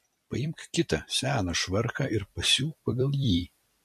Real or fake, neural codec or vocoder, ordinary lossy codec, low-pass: fake; vocoder, 44.1 kHz, 128 mel bands every 256 samples, BigVGAN v2; MP3, 64 kbps; 14.4 kHz